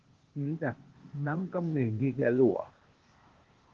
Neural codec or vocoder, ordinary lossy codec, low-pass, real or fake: codec, 16 kHz, 0.8 kbps, ZipCodec; Opus, 16 kbps; 7.2 kHz; fake